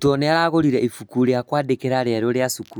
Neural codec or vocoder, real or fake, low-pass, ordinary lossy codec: none; real; none; none